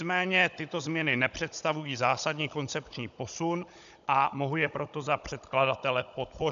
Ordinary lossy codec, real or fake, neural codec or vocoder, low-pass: MP3, 96 kbps; fake; codec, 16 kHz, 16 kbps, FunCodec, trained on Chinese and English, 50 frames a second; 7.2 kHz